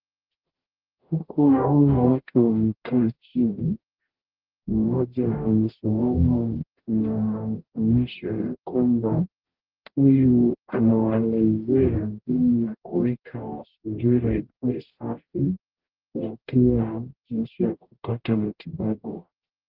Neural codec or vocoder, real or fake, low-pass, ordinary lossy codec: codec, 44.1 kHz, 0.9 kbps, DAC; fake; 5.4 kHz; Opus, 16 kbps